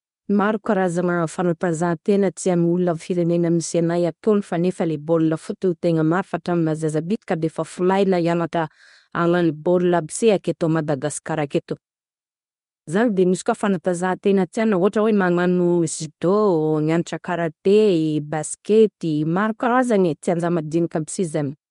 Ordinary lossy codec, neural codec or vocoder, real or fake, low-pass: MP3, 64 kbps; codec, 24 kHz, 0.9 kbps, WavTokenizer, medium speech release version 1; fake; 10.8 kHz